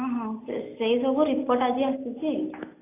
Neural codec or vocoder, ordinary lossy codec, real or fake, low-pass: none; AAC, 24 kbps; real; 3.6 kHz